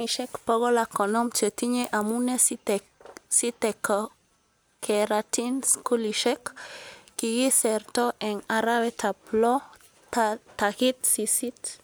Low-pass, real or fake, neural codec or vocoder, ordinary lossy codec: none; real; none; none